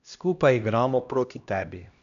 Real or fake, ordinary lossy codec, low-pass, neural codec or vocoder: fake; none; 7.2 kHz; codec, 16 kHz, 1 kbps, X-Codec, HuBERT features, trained on LibriSpeech